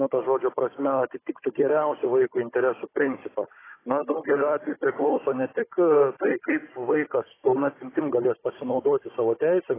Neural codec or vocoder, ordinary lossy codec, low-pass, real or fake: codec, 16 kHz, 16 kbps, FunCodec, trained on Chinese and English, 50 frames a second; AAC, 16 kbps; 3.6 kHz; fake